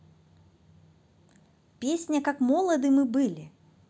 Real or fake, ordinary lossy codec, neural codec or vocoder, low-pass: real; none; none; none